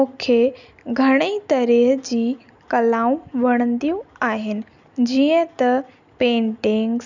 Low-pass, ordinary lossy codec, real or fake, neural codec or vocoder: 7.2 kHz; none; real; none